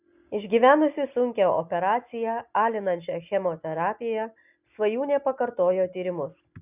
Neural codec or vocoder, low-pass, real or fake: none; 3.6 kHz; real